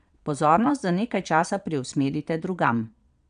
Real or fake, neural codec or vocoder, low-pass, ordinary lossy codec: fake; vocoder, 22.05 kHz, 80 mel bands, Vocos; 9.9 kHz; none